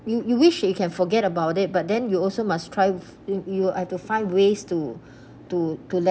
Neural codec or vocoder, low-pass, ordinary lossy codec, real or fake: none; none; none; real